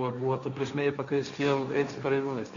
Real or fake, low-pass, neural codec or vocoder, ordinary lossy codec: fake; 7.2 kHz; codec, 16 kHz, 1.1 kbps, Voila-Tokenizer; Opus, 64 kbps